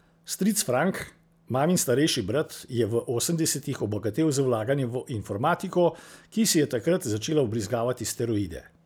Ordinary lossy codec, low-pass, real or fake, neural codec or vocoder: none; none; real; none